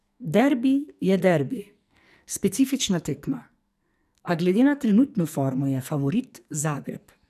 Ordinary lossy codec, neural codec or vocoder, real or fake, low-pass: none; codec, 44.1 kHz, 2.6 kbps, SNAC; fake; 14.4 kHz